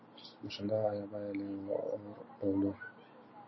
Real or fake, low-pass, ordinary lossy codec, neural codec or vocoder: real; 7.2 kHz; MP3, 24 kbps; none